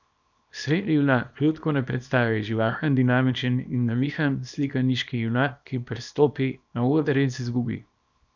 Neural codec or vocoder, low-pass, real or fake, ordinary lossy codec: codec, 24 kHz, 0.9 kbps, WavTokenizer, small release; 7.2 kHz; fake; none